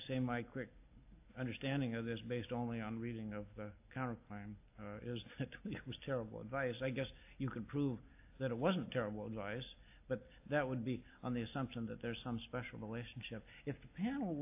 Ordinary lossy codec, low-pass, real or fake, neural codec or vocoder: AAC, 32 kbps; 3.6 kHz; real; none